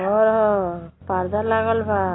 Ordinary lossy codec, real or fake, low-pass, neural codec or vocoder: AAC, 16 kbps; real; 7.2 kHz; none